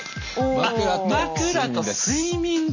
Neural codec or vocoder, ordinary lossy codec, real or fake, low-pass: none; none; real; 7.2 kHz